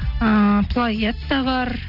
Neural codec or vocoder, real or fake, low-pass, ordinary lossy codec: none; real; 5.4 kHz; none